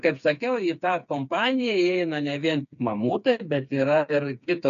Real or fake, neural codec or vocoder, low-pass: fake; codec, 16 kHz, 4 kbps, FreqCodec, smaller model; 7.2 kHz